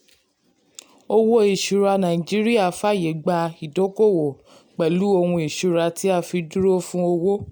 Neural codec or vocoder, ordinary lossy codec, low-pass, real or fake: none; none; none; real